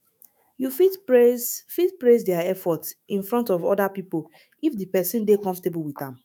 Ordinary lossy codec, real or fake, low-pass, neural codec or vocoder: none; fake; none; autoencoder, 48 kHz, 128 numbers a frame, DAC-VAE, trained on Japanese speech